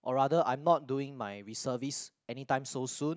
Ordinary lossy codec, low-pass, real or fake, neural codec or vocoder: none; none; real; none